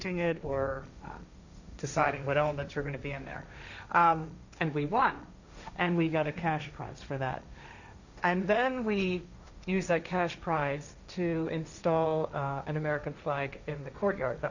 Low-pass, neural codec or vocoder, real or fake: 7.2 kHz; codec, 16 kHz, 1.1 kbps, Voila-Tokenizer; fake